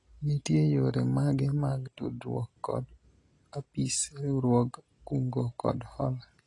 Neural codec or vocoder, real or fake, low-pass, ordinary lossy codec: none; real; 10.8 kHz; AAC, 32 kbps